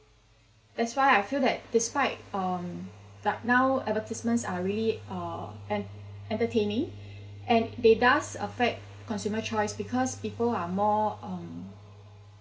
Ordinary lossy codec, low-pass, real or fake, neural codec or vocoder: none; none; real; none